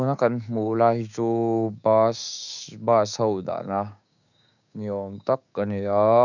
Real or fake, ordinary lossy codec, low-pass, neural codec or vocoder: fake; none; 7.2 kHz; codec, 16 kHz, 6 kbps, DAC